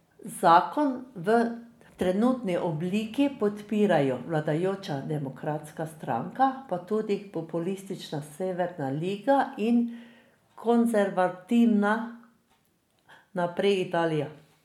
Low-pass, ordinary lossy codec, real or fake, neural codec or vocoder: 19.8 kHz; MP3, 96 kbps; real; none